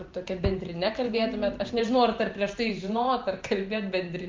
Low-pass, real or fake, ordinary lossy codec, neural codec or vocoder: 7.2 kHz; fake; Opus, 32 kbps; vocoder, 44.1 kHz, 128 mel bands every 512 samples, BigVGAN v2